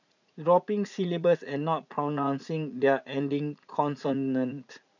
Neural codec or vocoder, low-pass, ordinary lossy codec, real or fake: vocoder, 44.1 kHz, 80 mel bands, Vocos; 7.2 kHz; none; fake